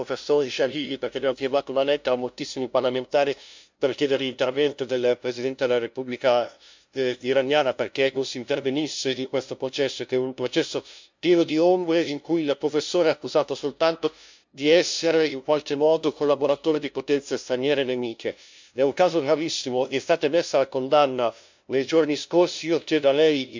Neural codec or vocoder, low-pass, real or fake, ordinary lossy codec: codec, 16 kHz, 0.5 kbps, FunCodec, trained on LibriTTS, 25 frames a second; 7.2 kHz; fake; MP3, 64 kbps